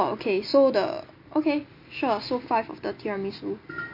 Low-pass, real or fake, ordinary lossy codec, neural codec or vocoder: 5.4 kHz; real; MP3, 32 kbps; none